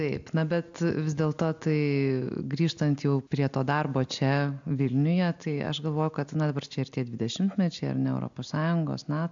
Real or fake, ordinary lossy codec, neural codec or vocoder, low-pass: real; AAC, 64 kbps; none; 7.2 kHz